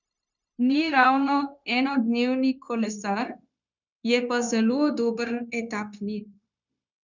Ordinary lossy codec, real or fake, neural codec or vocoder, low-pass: none; fake; codec, 16 kHz, 0.9 kbps, LongCat-Audio-Codec; 7.2 kHz